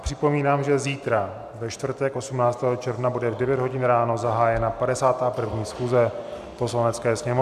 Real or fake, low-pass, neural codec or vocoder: real; 14.4 kHz; none